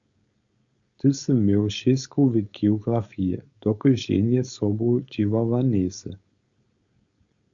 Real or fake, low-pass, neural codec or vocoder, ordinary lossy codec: fake; 7.2 kHz; codec, 16 kHz, 4.8 kbps, FACodec; Opus, 64 kbps